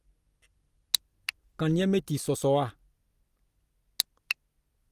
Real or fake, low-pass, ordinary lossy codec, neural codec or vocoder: fake; 14.4 kHz; Opus, 32 kbps; vocoder, 44.1 kHz, 128 mel bands every 256 samples, BigVGAN v2